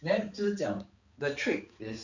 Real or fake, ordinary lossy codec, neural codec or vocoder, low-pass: fake; Opus, 64 kbps; codec, 16 kHz, 4 kbps, X-Codec, HuBERT features, trained on balanced general audio; 7.2 kHz